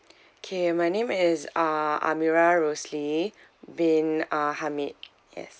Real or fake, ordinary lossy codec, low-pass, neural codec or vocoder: real; none; none; none